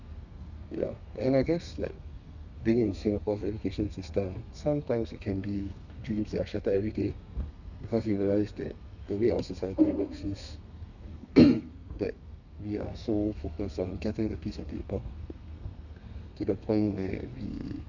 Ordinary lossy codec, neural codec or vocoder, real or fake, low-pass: none; codec, 32 kHz, 1.9 kbps, SNAC; fake; 7.2 kHz